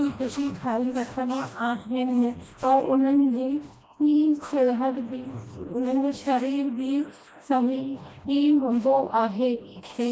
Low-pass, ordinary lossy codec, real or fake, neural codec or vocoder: none; none; fake; codec, 16 kHz, 1 kbps, FreqCodec, smaller model